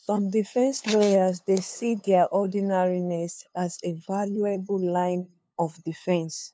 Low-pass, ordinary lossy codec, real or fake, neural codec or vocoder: none; none; fake; codec, 16 kHz, 2 kbps, FunCodec, trained on LibriTTS, 25 frames a second